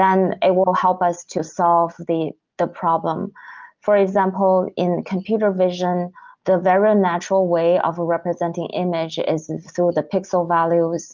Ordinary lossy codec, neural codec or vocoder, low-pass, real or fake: Opus, 32 kbps; none; 7.2 kHz; real